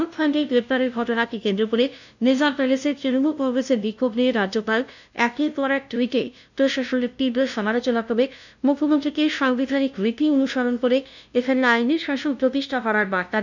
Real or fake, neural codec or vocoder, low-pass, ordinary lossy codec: fake; codec, 16 kHz, 0.5 kbps, FunCodec, trained on LibriTTS, 25 frames a second; 7.2 kHz; none